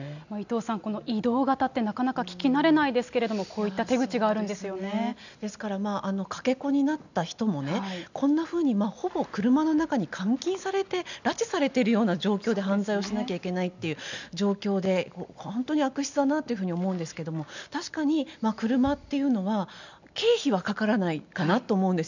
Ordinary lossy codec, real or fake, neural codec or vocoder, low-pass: none; real; none; 7.2 kHz